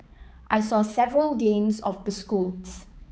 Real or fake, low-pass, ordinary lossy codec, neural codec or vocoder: fake; none; none; codec, 16 kHz, 4 kbps, X-Codec, HuBERT features, trained on balanced general audio